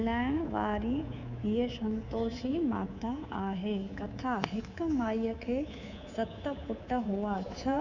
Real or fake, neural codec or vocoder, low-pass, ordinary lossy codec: fake; codec, 24 kHz, 3.1 kbps, DualCodec; 7.2 kHz; none